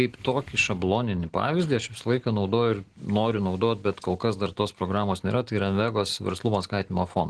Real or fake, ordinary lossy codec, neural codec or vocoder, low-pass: real; Opus, 16 kbps; none; 10.8 kHz